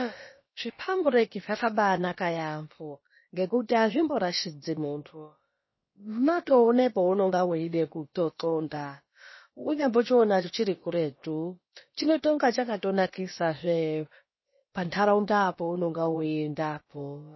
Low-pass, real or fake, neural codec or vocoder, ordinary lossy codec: 7.2 kHz; fake; codec, 16 kHz, about 1 kbps, DyCAST, with the encoder's durations; MP3, 24 kbps